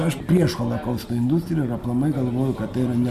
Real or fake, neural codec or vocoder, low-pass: real; none; 14.4 kHz